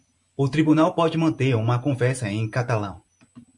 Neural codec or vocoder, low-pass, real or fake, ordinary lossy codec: vocoder, 44.1 kHz, 128 mel bands every 512 samples, BigVGAN v2; 10.8 kHz; fake; MP3, 64 kbps